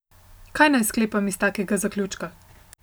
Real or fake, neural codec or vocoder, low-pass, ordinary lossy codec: real; none; none; none